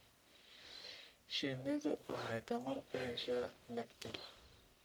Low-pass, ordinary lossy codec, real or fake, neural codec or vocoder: none; none; fake; codec, 44.1 kHz, 1.7 kbps, Pupu-Codec